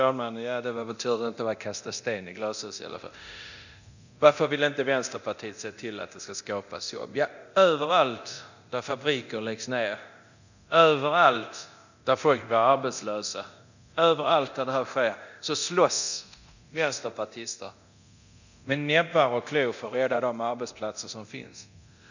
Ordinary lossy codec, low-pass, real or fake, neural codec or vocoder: none; 7.2 kHz; fake; codec, 24 kHz, 0.9 kbps, DualCodec